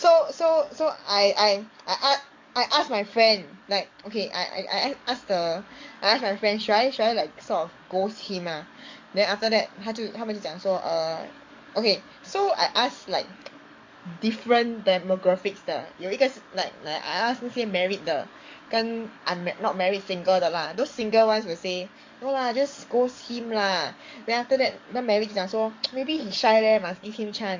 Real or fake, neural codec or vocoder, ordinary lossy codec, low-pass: fake; codec, 44.1 kHz, 7.8 kbps, DAC; MP3, 48 kbps; 7.2 kHz